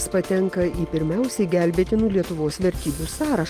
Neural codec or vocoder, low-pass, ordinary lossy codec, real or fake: none; 14.4 kHz; Opus, 24 kbps; real